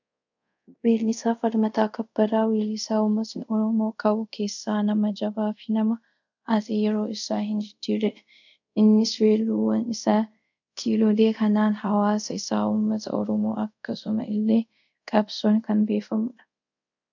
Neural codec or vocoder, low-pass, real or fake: codec, 24 kHz, 0.5 kbps, DualCodec; 7.2 kHz; fake